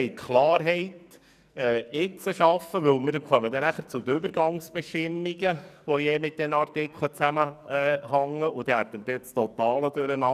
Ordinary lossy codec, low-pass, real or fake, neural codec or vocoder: none; 14.4 kHz; fake; codec, 44.1 kHz, 2.6 kbps, SNAC